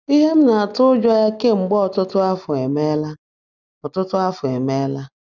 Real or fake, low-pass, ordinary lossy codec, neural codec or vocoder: real; 7.2 kHz; none; none